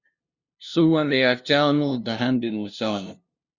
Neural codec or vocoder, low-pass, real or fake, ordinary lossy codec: codec, 16 kHz, 0.5 kbps, FunCodec, trained on LibriTTS, 25 frames a second; 7.2 kHz; fake; Opus, 64 kbps